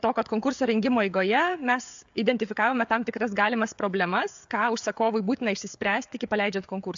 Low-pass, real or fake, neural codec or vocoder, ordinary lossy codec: 7.2 kHz; fake; codec, 16 kHz, 16 kbps, FreqCodec, smaller model; AAC, 64 kbps